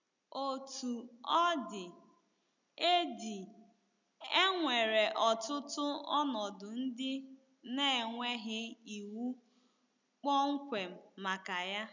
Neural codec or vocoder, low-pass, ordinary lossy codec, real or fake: none; 7.2 kHz; none; real